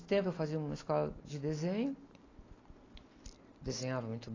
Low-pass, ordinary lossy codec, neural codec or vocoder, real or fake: 7.2 kHz; AAC, 32 kbps; none; real